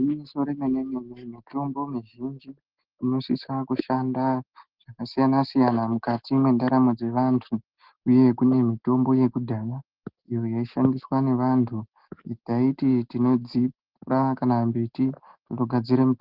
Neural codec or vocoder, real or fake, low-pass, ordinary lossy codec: none; real; 5.4 kHz; Opus, 16 kbps